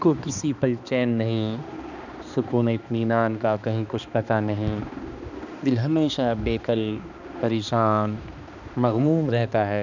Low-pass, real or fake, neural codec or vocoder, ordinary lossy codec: 7.2 kHz; fake; codec, 16 kHz, 2 kbps, X-Codec, HuBERT features, trained on balanced general audio; none